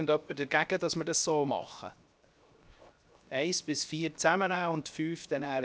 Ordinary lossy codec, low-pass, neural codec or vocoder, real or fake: none; none; codec, 16 kHz, 0.7 kbps, FocalCodec; fake